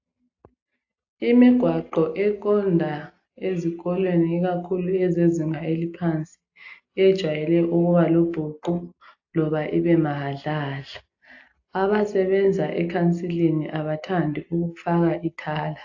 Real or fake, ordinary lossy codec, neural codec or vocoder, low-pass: real; AAC, 48 kbps; none; 7.2 kHz